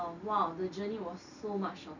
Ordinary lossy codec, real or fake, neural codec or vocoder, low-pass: none; real; none; 7.2 kHz